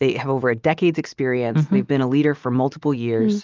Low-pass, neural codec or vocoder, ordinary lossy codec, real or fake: 7.2 kHz; none; Opus, 32 kbps; real